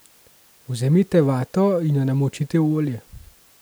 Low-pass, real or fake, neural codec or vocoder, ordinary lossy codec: none; real; none; none